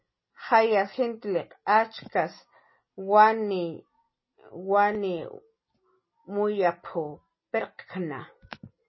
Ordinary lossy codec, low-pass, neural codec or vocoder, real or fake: MP3, 24 kbps; 7.2 kHz; none; real